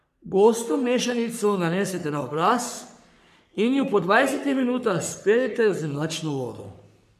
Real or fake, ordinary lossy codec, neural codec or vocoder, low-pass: fake; none; codec, 44.1 kHz, 3.4 kbps, Pupu-Codec; 14.4 kHz